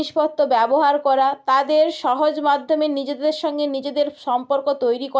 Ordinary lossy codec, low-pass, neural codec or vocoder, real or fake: none; none; none; real